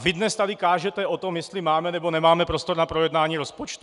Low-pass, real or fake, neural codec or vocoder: 10.8 kHz; real; none